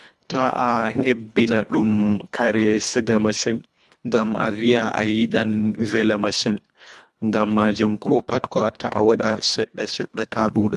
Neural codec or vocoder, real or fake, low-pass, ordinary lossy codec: codec, 24 kHz, 1.5 kbps, HILCodec; fake; none; none